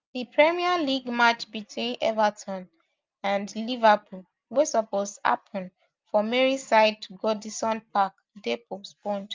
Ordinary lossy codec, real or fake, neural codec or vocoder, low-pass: Opus, 24 kbps; real; none; 7.2 kHz